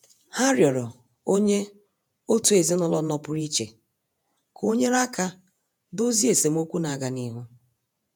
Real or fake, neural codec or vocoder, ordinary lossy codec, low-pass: fake; vocoder, 48 kHz, 128 mel bands, Vocos; none; none